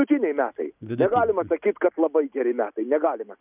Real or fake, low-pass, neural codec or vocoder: real; 3.6 kHz; none